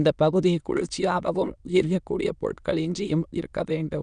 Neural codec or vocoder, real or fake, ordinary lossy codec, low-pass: autoencoder, 22.05 kHz, a latent of 192 numbers a frame, VITS, trained on many speakers; fake; none; 9.9 kHz